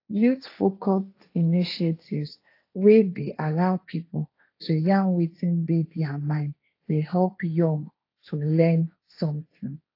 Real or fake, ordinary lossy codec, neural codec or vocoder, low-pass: fake; AAC, 32 kbps; codec, 16 kHz, 1.1 kbps, Voila-Tokenizer; 5.4 kHz